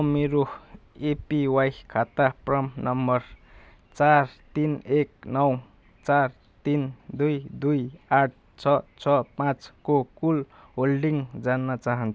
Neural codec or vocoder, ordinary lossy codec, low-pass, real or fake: none; none; none; real